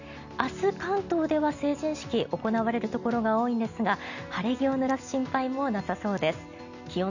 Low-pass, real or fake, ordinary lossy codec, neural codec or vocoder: 7.2 kHz; real; none; none